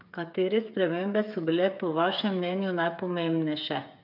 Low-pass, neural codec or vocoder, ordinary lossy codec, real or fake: 5.4 kHz; codec, 16 kHz, 8 kbps, FreqCodec, smaller model; none; fake